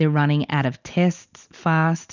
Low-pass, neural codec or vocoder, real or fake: 7.2 kHz; none; real